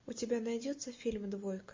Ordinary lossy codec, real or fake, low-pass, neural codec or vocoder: MP3, 32 kbps; real; 7.2 kHz; none